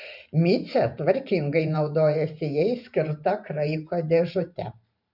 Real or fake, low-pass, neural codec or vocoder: fake; 5.4 kHz; vocoder, 44.1 kHz, 128 mel bands every 512 samples, BigVGAN v2